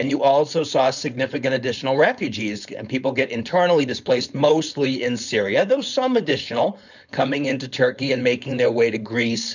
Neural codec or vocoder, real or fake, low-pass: codec, 16 kHz, 4.8 kbps, FACodec; fake; 7.2 kHz